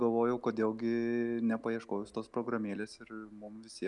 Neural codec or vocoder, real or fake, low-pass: none; real; 10.8 kHz